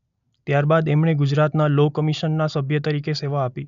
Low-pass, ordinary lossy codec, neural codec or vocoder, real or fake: 7.2 kHz; none; none; real